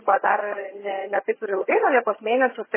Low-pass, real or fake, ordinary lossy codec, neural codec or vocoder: 3.6 kHz; fake; MP3, 16 kbps; vocoder, 22.05 kHz, 80 mel bands, HiFi-GAN